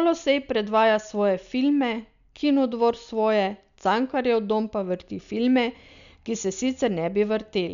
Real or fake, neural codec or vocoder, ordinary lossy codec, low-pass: real; none; none; 7.2 kHz